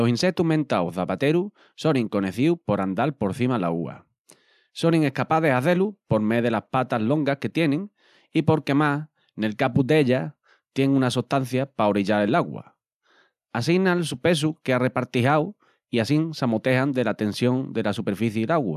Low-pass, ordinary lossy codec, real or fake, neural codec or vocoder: 14.4 kHz; none; real; none